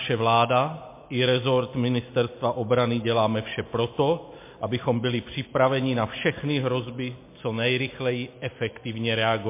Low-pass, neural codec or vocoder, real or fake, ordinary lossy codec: 3.6 kHz; none; real; MP3, 24 kbps